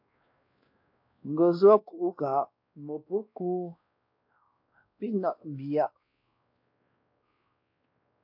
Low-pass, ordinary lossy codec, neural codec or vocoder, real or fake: 5.4 kHz; AAC, 48 kbps; codec, 16 kHz, 1 kbps, X-Codec, WavLM features, trained on Multilingual LibriSpeech; fake